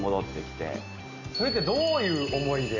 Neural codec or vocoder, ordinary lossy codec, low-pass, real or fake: none; none; 7.2 kHz; real